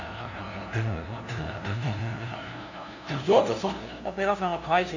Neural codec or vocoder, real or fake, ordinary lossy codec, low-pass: codec, 16 kHz, 0.5 kbps, FunCodec, trained on LibriTTS, 25 frames a second; fake; none; 7.2 kHz